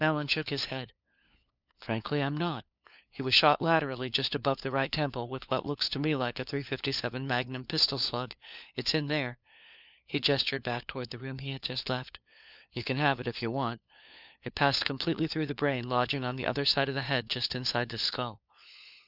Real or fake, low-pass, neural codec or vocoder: fake; 5.4 kHz; codec, 16 kHz, 4 kbps, FunCodec, trained on LibriTTS, 50 frames a second